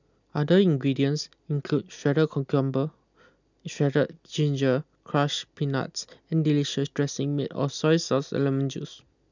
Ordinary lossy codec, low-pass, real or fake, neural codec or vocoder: none; 7.2 kHz; real; none